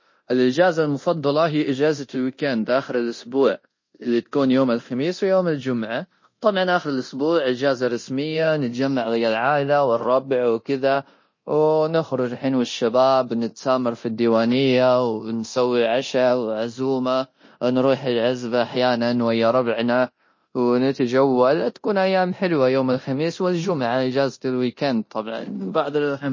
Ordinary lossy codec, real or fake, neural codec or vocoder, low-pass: MP3, 32 kbps; fake; codec, 24 kHz, 0.9 kbps, DualCodec; 7.2 kHz